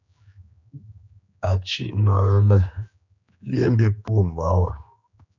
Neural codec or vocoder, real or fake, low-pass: codec, 16 kHz, 2 kbps, X-Codec, HuBERT features, trained on general audio; fake; 7.2 kHz